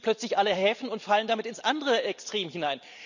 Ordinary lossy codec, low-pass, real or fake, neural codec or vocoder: none; 7.2 kHz; real; none